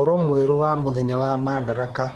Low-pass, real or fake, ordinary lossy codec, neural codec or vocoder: 10.8 kHz; fake; Opus, 24 kbps; codec, 24 kHz, 1 kbps, SNAC